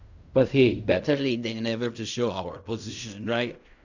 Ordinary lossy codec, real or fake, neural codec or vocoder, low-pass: none; fake; codec, 16 kHz in and 24 kHz out, 0.4 kbps, LongCat-Audio-Codec, fine tuned four codebook decoder; 7.2 kHz